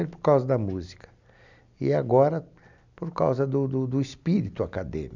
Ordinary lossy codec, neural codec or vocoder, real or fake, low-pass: none; none; real; 7.2 kHz